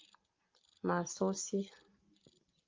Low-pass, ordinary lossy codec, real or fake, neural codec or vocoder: 7.2 kHz; Opus, 32 kbps; real; none